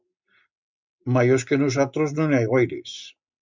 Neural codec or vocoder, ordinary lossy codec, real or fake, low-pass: none; MP3, 64 kbps; real; 7.2 kHz